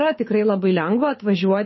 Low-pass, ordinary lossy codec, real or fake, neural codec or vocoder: 7.2 kHz; MP3, 24 kbps; fake; codec, 24 kHz, 6 kbps, HILCodec